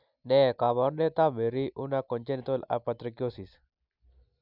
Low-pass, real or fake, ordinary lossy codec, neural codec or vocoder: 5.4 kHz; real; none; none